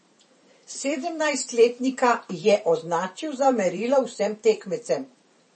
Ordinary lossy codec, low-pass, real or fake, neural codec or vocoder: MP3, 32 kbps; 10.8 kHz; fake; vocoder, 44.1 kHz, 128 mel bands every 512 samples, BigVGAN v2